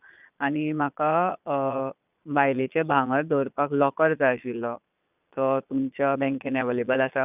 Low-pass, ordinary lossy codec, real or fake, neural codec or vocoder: 3.6 kHz; none; fake; vocoder, 22.05 kHz, 80 mel bands, Vocos